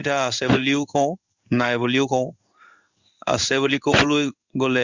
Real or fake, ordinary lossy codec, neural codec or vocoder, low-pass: fake; Opus, 64 kbps; codec, 16 kHz in and 24 kHz out, 1 kbps, XY-Tokenizer; 7.2 kHz